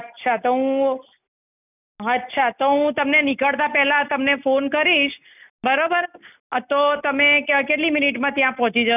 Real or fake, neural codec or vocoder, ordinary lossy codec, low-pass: real; none; none; 3.6 kHz